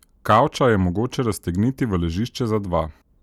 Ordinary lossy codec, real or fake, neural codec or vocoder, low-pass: none; real; none; 19.8 kHz